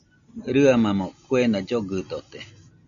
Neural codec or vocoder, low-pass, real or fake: none; 7.2 kHz; real